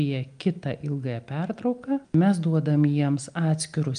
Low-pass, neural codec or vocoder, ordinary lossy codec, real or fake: 9.9 kHz; none; Opus, 32 kbps; real